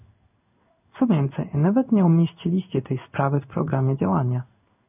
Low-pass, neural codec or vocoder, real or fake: 3.6 kHz; codec, 16 kHz in and 24 kHz out, 1 kbps, XY-Tokenizer; fake